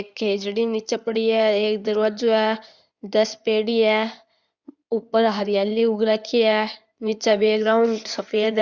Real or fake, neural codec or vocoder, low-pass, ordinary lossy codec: fake; codec, 16 kHz in and 24 kHz out, 2.2 kbps, FireRedTTS-2 codec; 7.2 kHz; Opus, 64 kbps